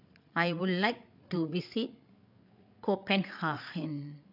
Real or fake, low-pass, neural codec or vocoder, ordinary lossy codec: fake; 5.4 kHz; codec, 16 kHz, 8 kbps, FreqCodec, larger model; none